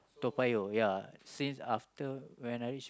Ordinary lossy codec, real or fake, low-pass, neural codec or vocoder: none; real; none; none